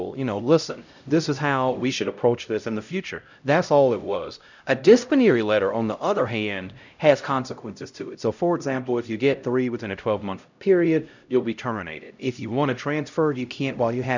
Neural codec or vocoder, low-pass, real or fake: codec, 16 kHz, 0.5 kbps, X-Codec, HuBERT features, trained on LibriSpeech; 7.2 kHz; fake